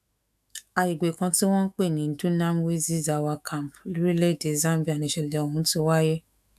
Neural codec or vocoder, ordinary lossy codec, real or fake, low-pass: autoencoder, 48 kHz, 128 numbers a frame, DAC-VAE, trained on Japanese speech; none; fake; 14.4 kHz